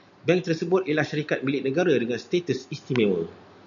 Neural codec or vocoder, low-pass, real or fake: none; 7.2 kHz; real